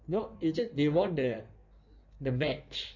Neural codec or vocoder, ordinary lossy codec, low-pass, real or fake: codec, 16 kHz in and 24 kHz out, 1.1 kbps, FireRedTTS-2 codec; none; 7.2 kHz; fake